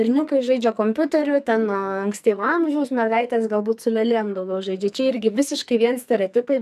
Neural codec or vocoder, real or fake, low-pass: codec, 44.1 kHz, 2.6 kbps, SNAC; fake; 14.4 kHz